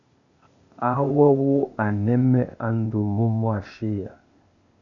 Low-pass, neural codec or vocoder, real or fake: 7.2 kHz; codec, 16 kHz, 0.8 kbps, ZipCodec; fake